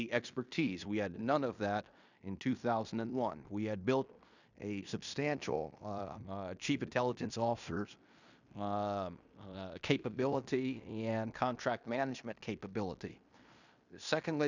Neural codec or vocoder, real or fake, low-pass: codec, 16 kHz in and 24 kHz out, 0.9 kbps, LongCat-Audio-Codec, fine tuned four codebook decoder; fake; 7.2 kHz